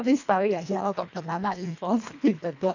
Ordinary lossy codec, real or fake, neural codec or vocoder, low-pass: none; fake; codec, 24 kHz, 1.5 kbps, HILCodec; 7.2 kHz